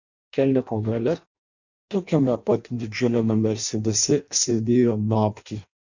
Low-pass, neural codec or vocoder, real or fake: 7.2 kHz; codec, 16 kHz in and 24 kHz out, 0.6 kbps, FireRedTTS-2 codec; fake